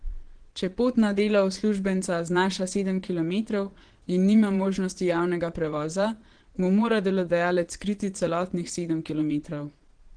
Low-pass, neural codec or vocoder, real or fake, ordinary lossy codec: 9.9 kHz; vocoder, 22.05 kHz, 80 mel bands, Vocos; fake; Opus, 16 kbps